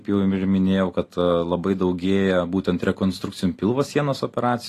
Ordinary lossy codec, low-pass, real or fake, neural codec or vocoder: AAC, 48 kbps; 14.4 kHz; real; none